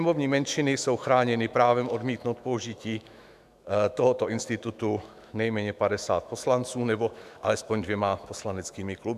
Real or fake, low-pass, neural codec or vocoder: fake; 14.4 kHz; autoencoder, 48 kHz, 128 numbers a frame, DAC-VAE, trained on Japanese speech